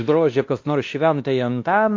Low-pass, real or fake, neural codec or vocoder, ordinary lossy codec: 7.2 kHz; fake; codec, 16 kHz, 1 kbps, X-Codec, WavLM features, trained on Multilingual LibriSpeech; AAC, 48 kbps